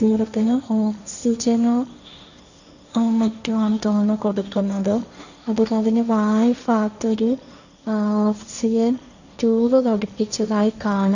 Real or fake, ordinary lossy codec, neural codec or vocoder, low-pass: fake; none; codec, 16 kHz, 1.1 kbps, Voila-Tokenizer; 7.2 kHz